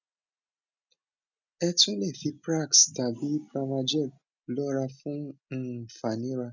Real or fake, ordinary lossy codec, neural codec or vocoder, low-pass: real; none; none; 7.2 kHz